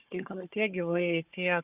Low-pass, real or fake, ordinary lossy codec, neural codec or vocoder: 3.6 kHz; fake; Opus, 64 kbps; codec, 16 kHz, 4 kbps, FunCodec, trained on Chinese and English, 50 frames a second